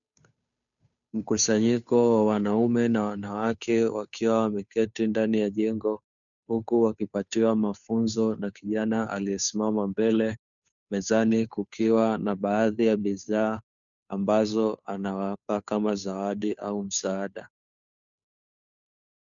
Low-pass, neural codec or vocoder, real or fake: 7.2 kHz; codec, 16 kHz, 2 kbps, FunCodec, trained on Chinese and English, 25 frames a second; fake